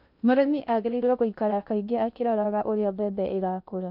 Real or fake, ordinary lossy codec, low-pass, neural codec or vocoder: fake; none; 5.4 kHz; codec, 16 kHz in and 24 kHz out, 0.6 kbps, FocalCodec, streaming, 2048 codes